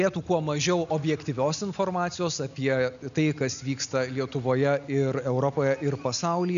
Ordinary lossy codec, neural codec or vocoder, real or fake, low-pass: AAC, 96 kbps; none; real; 7.2 kHz